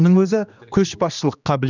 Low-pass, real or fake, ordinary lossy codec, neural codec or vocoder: 7.2 kHz; fake; none; codec, 16 kHz, 2 kbps, X-Codec, HuBERT features, trained on general audio